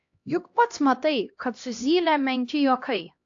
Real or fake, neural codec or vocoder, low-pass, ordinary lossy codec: fake; codec, 16 kHz, 1 kbps, X-Codec, HuBERT features, trained on LibriSpeech; 7.2 kHz; AAC, 48 kbps